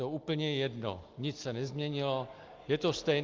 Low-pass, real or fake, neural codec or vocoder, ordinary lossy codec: 7.2 kHz; real; none; Opus, 32 kbps